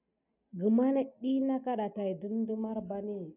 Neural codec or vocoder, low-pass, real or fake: none; 3.6 kHz; real